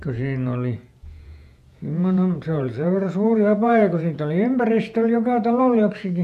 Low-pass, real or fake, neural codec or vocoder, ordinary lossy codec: 14.4 kHz; fake; vocoder, 48 kHz, 128 mel bands, Vocos; none